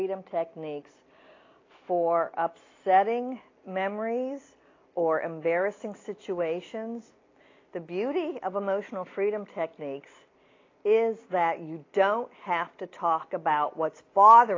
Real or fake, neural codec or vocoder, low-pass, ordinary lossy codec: real; none; 7.2 kHz; AAC, 32 kbps